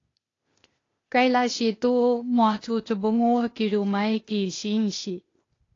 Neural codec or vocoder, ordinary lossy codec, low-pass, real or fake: codec, 16 kHz, 0.8 kbps, ZipCodec; AAC, 32 kbps; 7.2 kHz; fake